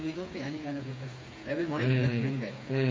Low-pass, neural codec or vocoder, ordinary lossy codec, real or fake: none; codec, 16 kHz, 4 kbps, FreqCodec, smaller model; none; fake